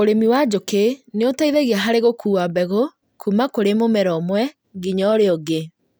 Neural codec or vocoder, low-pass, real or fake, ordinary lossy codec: none; none; real; none